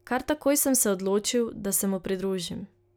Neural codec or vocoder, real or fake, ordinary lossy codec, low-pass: none; real; none; none